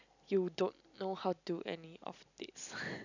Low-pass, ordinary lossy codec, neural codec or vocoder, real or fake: 7.2 kHz; none; none; real